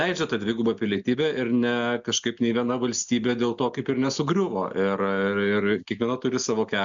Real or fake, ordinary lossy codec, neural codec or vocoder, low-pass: real; MP3, 64 kbps; none; 7.2 kHz